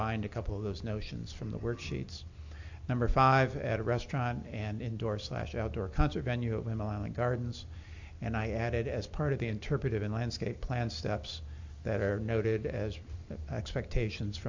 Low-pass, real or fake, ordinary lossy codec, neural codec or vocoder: 7.2 kHz; real; AAC, 48 kbps; none